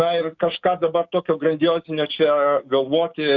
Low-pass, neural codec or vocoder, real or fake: 7.2 kHz; codec, 16 kHz, 6 kbps, DAC; fake